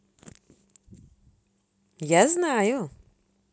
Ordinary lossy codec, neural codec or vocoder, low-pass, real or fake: none; none; none; real